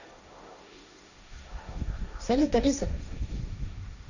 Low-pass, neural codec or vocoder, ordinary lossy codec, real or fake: 7.2 kHz; codec, 16 kHz, 1.1 kbps, Voila-Tokenizer; none; fake